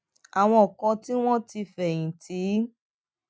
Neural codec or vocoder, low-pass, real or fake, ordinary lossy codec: none; none; real; none